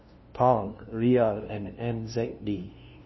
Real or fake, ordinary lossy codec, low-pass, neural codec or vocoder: fake; MP3, 24 kbps; 7.2 kHz; codec, 16 kHz, 0.5 kbps, FunCodec, trained on LibriTTS, 25 frames a second